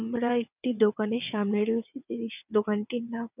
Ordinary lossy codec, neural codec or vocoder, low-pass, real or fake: none; vocoder, 22.05 kHz, 80 mel bands, WaveNeXt; 3.6 kHz; fake